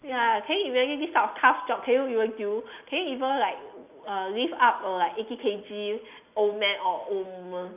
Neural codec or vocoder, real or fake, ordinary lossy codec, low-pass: none; real; none; 3.6 kHz